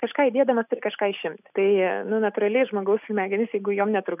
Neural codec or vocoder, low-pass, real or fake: none; 3.6 kHz; real